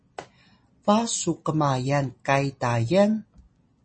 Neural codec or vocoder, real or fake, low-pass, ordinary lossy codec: none; real; 9.9 kHz; MP3, 32 kbps